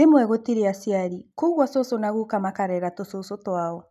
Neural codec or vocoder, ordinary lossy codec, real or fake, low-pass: none; none; real; 14.4 kHz